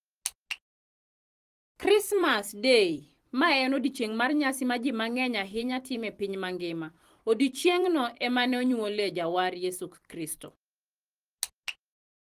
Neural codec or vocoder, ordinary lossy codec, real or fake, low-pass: vocoder, 44.1 kHz, 128 mel bands every 256 samples, BigVGAN v2; Opus, 24 kbps; fake; 14.4 kHz